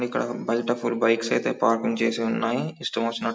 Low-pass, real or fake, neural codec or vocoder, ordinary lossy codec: none; real; none; none